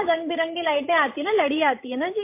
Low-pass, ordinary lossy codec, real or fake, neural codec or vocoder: 3.6 kHz; MP3, 24 kbps; fake; codec, 16 kHz, 16 kbps, FreqCodec, larger model